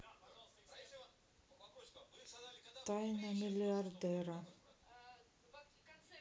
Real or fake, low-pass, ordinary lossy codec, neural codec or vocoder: real; none; none; none